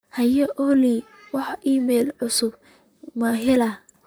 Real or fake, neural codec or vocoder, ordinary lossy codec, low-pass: fake; vocoder, 44.1 kHz, 128 mel bands, Pupu-Vocoder; none; none